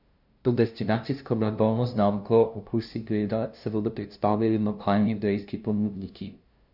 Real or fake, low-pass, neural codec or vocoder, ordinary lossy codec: fake; 5.4 kHz; codec, 16 kHz, 0.5 kbps, FunCodec, trained on LibriTTS, 25 frames a second; none